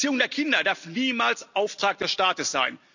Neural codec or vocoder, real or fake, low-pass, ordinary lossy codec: none; real; 7.2 kHz; none